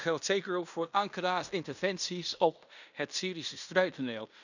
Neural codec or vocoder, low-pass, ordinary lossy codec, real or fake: codec, 16 kHz in and 24 kHz out, 0.9 kbps, LongCat-Audio-Codec, fine tuned four codebook decoder; 7.2 kHz; none; fake